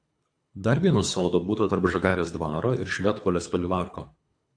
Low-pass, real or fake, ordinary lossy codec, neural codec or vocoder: 9.9 kHz; fake; AAC, 64 kbps; codec, 24 kHz, 3 kbps, HILCodec